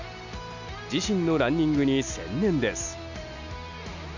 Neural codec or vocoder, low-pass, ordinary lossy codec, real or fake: none; 7.2 kHz; none; real